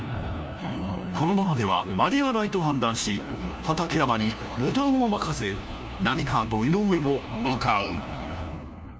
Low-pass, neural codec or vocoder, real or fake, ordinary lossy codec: none; codec, 16 kHz, 1 kbps, FunCodec, trained on LibriTTS, 50 frames a second; fake; none